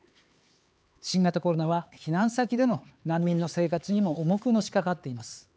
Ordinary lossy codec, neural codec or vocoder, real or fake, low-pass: none; codec, 16 kHz, 4 kbps, X-Codec, HuBERT features, trained on LibriSpeech; fake; none